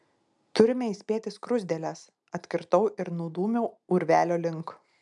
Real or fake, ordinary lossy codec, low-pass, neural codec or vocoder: real; MP3, 96 kbps; 10.8 kHz; none